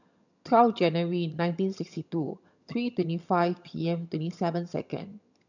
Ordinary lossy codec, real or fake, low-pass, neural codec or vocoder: none; fake; 7.2 kHz; vocoder, 22.05 kHz, 80 mel bands, HiFi-GAN